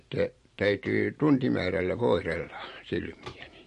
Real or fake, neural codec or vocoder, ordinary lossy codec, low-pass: fake; vocoder, 44.1 kHz, 128 mel bands every 512 samples, BigVGAN v2; MP3, 48 kbps; 14.4 kHz